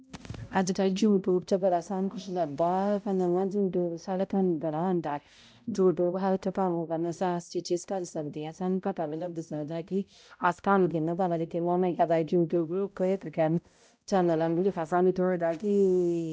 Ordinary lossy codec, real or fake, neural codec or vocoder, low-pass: none; fake; codec, 16 kHz, 0.5 kbps, X-Codec, HuBERT features, trained on balanced general audio; none